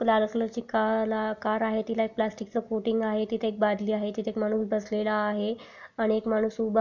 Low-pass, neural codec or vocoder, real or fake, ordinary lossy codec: 7.2 kHz; none; real; none